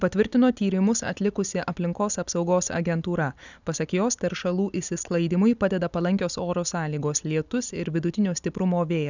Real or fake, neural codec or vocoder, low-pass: real; none; 7.2 kHz